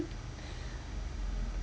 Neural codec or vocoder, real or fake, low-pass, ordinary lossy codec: none; real; none; none